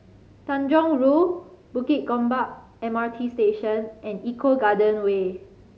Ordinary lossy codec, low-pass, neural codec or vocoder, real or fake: none; none; none; real